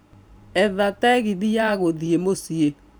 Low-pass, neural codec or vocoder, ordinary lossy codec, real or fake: none; vocoder, 44.1 kHz, 128 mel bands every 512 samples, BigVGAN v2; none; fake